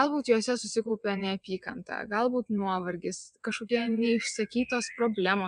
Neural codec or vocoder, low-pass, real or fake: vocoder, 22.05 kHz, 80 mel bands, WaveNeXt; 9.9 kHz; fake